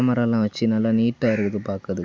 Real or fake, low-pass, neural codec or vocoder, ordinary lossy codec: real; none; none; none